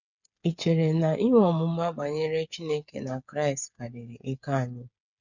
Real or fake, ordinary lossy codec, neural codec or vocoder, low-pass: fake; none; codec, 16 kHz, 8 kbps, FreqCodec, smaller model; 7.2 kHz